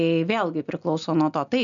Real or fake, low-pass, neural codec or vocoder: real; 7.2 kHz; none